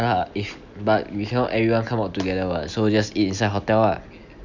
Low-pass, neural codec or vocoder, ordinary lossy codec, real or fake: 7.2 kHz; none; none; real